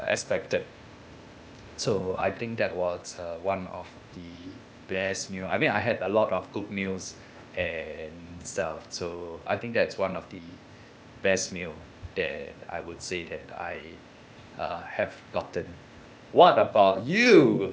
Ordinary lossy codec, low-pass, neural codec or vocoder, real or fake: none; none; codec, 16 kHz, 0.8 kbps, ZipCodec; fake